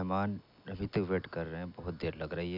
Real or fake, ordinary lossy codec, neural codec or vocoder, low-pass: real; none; none; 5.4 kHz